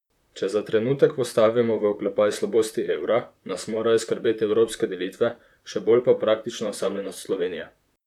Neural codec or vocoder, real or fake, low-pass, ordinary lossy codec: vocoder, 44.1 kHz, 128 mel bands, Pupu-Vocoder; fake; 19.8 kHz; none